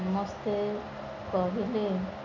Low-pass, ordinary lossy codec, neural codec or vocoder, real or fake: 7.2 kHz; none; none; real